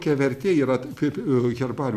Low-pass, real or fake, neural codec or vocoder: 14.4 kHz; real; none